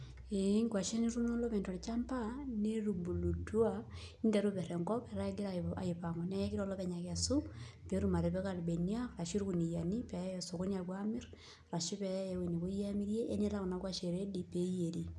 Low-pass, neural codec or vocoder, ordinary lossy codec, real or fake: none; none; none; real